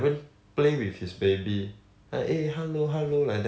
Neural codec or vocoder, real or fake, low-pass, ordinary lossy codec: none; real; none; none